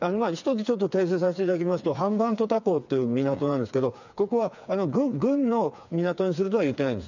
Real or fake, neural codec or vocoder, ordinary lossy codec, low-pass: fake; codec, 16 kHz, 4 kbps, FreqCodec, smaller model; none; 7.2 kHz